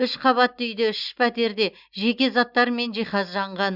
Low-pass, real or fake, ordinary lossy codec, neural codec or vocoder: 5.4 kHz; real; Opus, 64 kbps; none